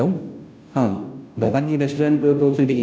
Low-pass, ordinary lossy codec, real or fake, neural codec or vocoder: none; none; fake; codec, 16 kHz, 0.5 kbps, FunCodec, trained on Chinese and English, 25 frames a second